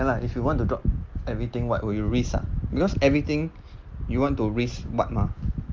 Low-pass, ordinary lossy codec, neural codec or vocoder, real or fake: 7.2 kHz; Opus, 32 kbps; none; real